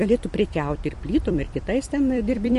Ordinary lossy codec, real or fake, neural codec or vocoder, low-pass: MP3, 48 kbps; fake; vocoder, 44.1 kHz, 128 mel bands every 256 samples, BigVGAN v2; 14.4 kHz